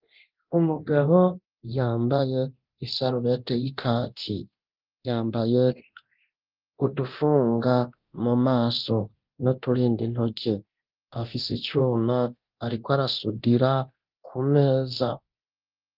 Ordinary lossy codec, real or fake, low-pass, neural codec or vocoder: Opus, 32 kbps; fake; 5.4 kHz; codec, 24 kHz, 0.9 kbps, DualCodec